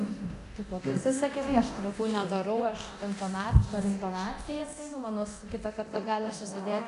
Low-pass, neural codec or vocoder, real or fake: 10.8 kHz; codec, 24 kHz, 0.9 kbps, DualCodec; fake